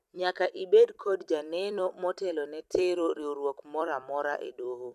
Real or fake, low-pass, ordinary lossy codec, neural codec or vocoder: fake; 14.4 kHz; none; vocoder, 44.1 kHz, 128 mel bands every 256 samples, BigVGAN v2